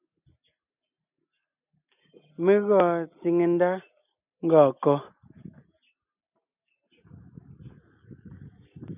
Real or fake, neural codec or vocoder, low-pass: real; none; 3.6 kHz